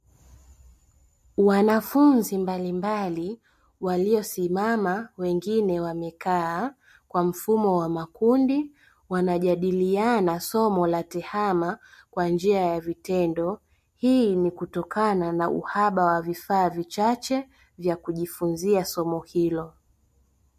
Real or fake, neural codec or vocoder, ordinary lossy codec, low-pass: real; none; MP3, 64 kbps; 14.4 kHz